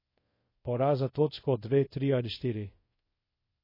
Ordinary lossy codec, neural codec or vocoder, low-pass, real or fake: MP3, 24 kbps; codec, 24 kHz, 0.5 kbps, DualCodec; 5.4 kHz; fake